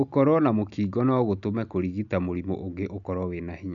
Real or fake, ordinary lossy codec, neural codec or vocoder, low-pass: real; none; none; 7.2 kHz